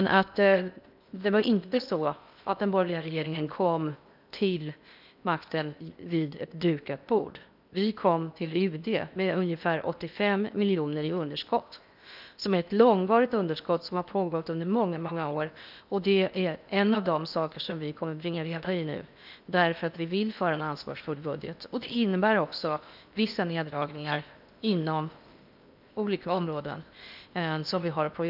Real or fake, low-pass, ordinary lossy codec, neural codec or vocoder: fake; 5.4 kHz; none; codec, 16 kHz in and 24 kHz out, 0.8 kbps, FocalCodec, streaming, 65536 codes